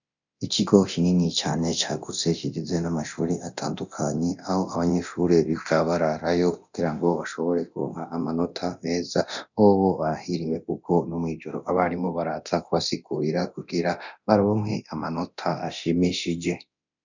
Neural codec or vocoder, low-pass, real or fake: codec, 24 kHz, 0.9 kbps, DualCodec; 7.2 kHz; fake